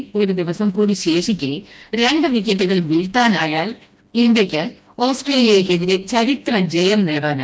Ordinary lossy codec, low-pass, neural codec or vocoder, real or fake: none; none; codec, 16 kHz, 1 kbps, FreqCodec, smaller model; fake